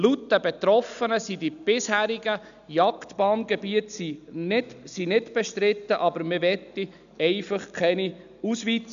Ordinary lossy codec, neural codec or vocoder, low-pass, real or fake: none; none; 7.2 kHz; real